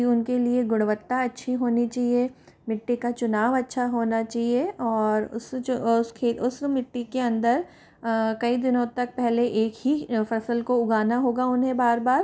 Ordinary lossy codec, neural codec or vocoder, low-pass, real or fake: none; none; none; real